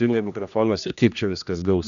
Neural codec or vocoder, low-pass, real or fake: codec, 16 kHz, 1 kbps, X-Codec, HuBERT features, trained on general audio; 7.2 kHz; fake